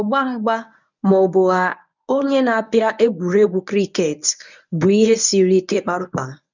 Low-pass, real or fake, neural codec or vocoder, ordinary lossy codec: 7.2 kHz; fake; codec, 24 kHz, 0.9 kbps, WavTokenizer, medium speech release version 1; none